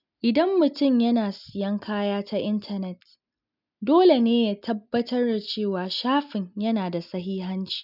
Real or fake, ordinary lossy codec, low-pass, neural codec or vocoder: real; none; 5.4 kHz; none